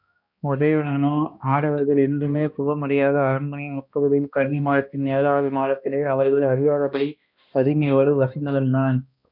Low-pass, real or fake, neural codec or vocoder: 5.4 kHz; fake; codec, 16 kHz, 1 kbps, X-Codec, HuBERT features, trained on balanced general audio